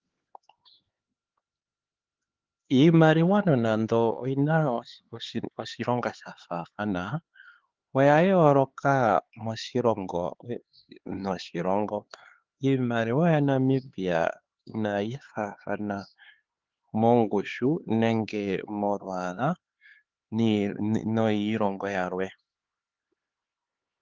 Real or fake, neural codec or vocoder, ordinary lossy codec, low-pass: fake; codec, 16 kHz, 4 kbps, X-Codec, HuBERT features, trained on LibriSpeech; Opus, 16 kbps; 7.2 kHz